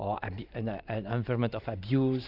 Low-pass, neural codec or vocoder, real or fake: 5.4 kHz; vocoder, 44.1 kHz, 128 mel bands every 512 samples, BigVGAN v2; fake